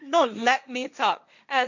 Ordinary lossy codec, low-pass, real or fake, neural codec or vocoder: none; 7.2 kHz; fake; codec, 16 kHz, 1.1 kbps, Voila-Tokenizer